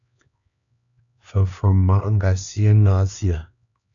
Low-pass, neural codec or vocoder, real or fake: 7.2 kHz; codec, 16 kHz, 2 kbps, X-Codec, HuBERT features, trained on LibriSpeech; fake